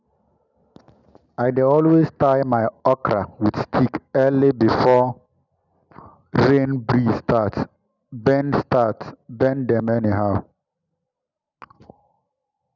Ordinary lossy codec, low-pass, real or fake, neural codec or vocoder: none; 7.2 kHz; real; none